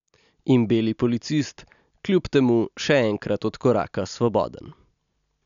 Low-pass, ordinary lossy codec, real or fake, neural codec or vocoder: 7.2 kHz; none; real; none